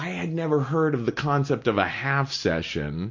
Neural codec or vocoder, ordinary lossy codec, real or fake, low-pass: none; MP3, 48 kbps; real; 7.2 kHz